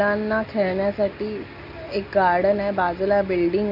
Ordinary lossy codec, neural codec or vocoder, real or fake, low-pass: none; none; real; 5.4 kHz